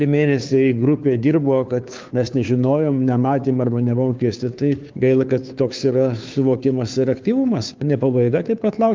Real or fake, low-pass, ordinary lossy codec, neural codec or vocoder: fake; 7.2 kHz; Opus, 24 kbps; codec, 16 kHz, 2 kbps, FunCodec, trained on Chinese and English, 25 frames a second